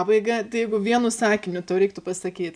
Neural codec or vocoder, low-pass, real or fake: none; 9.9 kHz; real